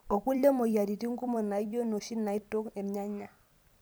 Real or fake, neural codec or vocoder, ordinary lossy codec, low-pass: fake; vocoder, 44.1 kHz, 128 mel bands every 256 samples, BigVGAN v2; none; none